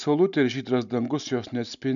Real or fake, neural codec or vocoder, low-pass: real; none; 7.2 kHz